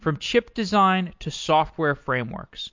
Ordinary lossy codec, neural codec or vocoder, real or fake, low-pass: MP3, 48 kbps; none; real; 7.2 kHz